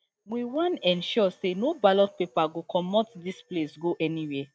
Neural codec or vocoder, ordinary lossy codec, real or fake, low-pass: none; none; real; none